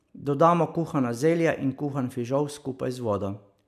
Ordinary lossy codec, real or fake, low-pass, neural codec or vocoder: MP3, 96 kbps; real; 14.4 kHz; none